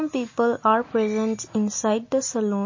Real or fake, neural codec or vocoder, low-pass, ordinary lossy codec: real; none; 7.2 kHz; MP3, 32 kbps